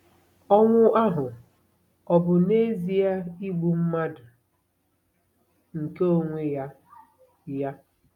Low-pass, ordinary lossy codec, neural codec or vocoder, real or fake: 19.8 kHz; none; none; real